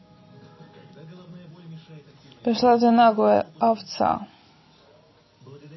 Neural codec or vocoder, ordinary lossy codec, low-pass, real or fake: none; MP3, 24 kbps; 7.2 kHz; real